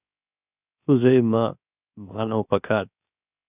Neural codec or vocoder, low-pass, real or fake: codec, 16 kHz, 0.7 kbps, FocalCodec; 3.6 kHz; fake